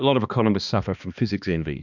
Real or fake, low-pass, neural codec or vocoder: fake; 7.2 kHz; codec, 16 kHz, 2 kbps, X-Codec, HuBERT features, trained on balanced general audio